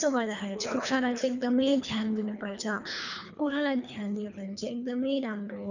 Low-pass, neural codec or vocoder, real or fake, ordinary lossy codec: 7.2 kHz; codec, 24 kHz, 3 kbps, HILCodec; fake; none